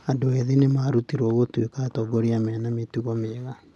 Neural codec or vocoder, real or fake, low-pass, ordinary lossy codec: none; real; none; none